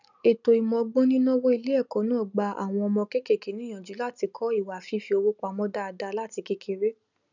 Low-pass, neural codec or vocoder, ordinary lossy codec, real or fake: 7.2 kHz; none; none; real